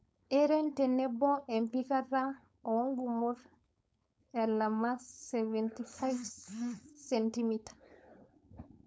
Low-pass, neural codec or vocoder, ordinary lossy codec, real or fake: none; codec, 16 kHz, 4.8 kbps, FACodec; none; fake